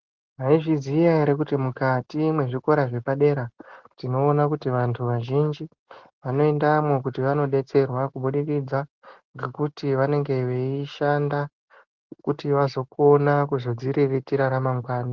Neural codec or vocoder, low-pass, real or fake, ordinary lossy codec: none; 7.2 kHz; real; Opus, 16 kbps